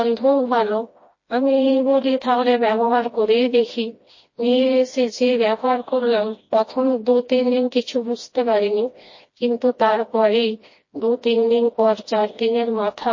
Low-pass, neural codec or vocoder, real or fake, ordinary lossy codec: 7.2 kHz; codec, 16 kHz, 1 kbps, FreqCodec, smaller model; fake; MP3, 32 kbps